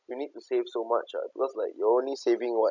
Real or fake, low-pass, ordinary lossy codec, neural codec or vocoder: real; 7.2 kHz; MP3, 64 kbps; none